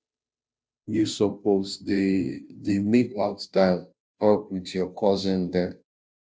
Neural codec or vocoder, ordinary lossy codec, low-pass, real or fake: codec, 16 kHz, 0.5 kbps, FunCodec, trained on Chinese and English, 25 frames a second; none; none; fake